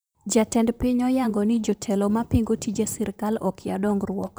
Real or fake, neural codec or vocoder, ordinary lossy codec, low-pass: fake; vocoder, 44.1 kHz, 128 mel bands, Pupu-Vocoder; none; none